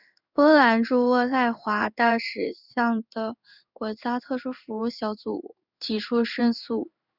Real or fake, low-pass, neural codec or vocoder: fake; 5.4 kHz; codec, 16 kHz in and 24 kHz out, 1 kbps, XY-Tokenizer